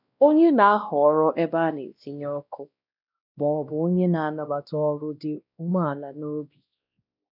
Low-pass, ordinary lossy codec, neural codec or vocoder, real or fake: 5.4 kHz; none; codec, 16 kHz, 1 kbps, X-Codec, WavLM features, trained on Multilingual LibriSpeech; fake